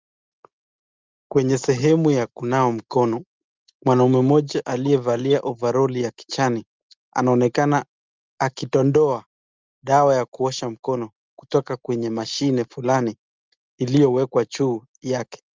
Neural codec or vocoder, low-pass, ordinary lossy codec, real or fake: none; 7.2 kHz; Opus, 32 kbps; real